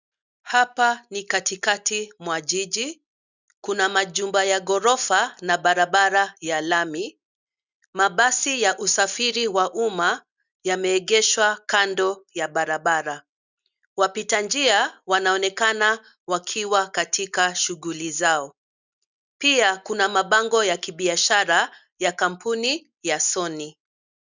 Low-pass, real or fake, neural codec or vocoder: 7.2 kHz; real; none